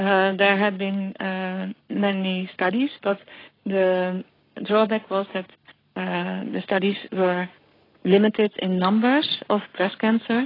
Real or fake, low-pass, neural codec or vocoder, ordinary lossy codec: fake; 5.4 kHz; codec, 44.1 kHz, 7.8 kbps, Pupu-Codec; AAC, 24 kbps